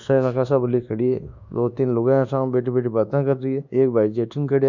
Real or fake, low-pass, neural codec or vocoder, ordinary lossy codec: fake; 7.2 kHz; codec, 24 kHz, 1.2 kbps, DualCodec; none